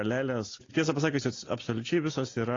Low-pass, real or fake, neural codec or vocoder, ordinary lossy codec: 7.2 kHz; real; none; AAC, 32 kbps